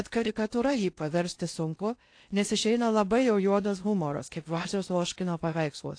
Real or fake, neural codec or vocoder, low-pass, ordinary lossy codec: fake; codec, 16 kHz in and 24 kHz out, 0.8 kbps, FocalCodec, streaming, 65536 codes; 9.9 kHz; AAC, 48 kbps